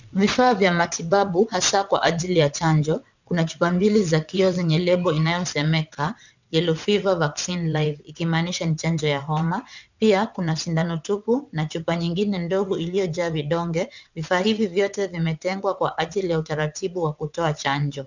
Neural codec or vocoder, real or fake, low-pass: vocoder, 22.05 kHz, 80 mel bands, WaveNeXt; fake; 7.2 kHz